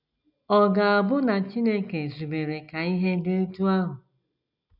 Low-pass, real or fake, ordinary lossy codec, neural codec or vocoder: 5.4 kHz; real; none; none